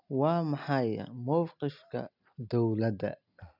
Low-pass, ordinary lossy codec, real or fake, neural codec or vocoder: 5.4 kHz; none; real; none